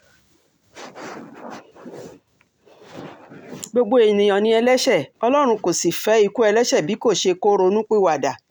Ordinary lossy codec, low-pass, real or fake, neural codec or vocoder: none; none; real; none